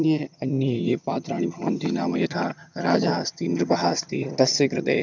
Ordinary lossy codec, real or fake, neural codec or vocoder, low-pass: none; fake; vocoder, 22.05 kHz, 80 mel bands, HiFi-GAN; 7.2 kHz